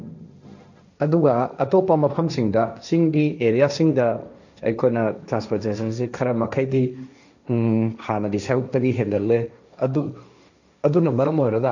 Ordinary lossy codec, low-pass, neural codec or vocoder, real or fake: none; 7.2 kHz; codec, 16 kHz, 1.1 kbps, Voila-Tokenizer; fake